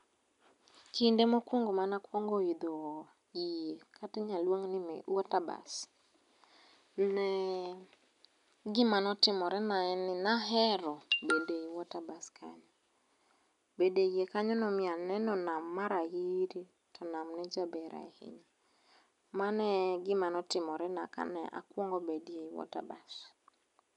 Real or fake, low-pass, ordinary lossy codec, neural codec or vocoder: real; 10.8 kHz; none; none